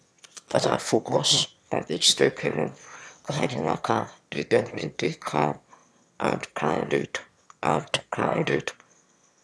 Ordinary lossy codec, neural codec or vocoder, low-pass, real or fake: none; autoencoder, 22.05 kHz, a latent of 192 numbers a frame, VITS, trained on one speaker; none; fake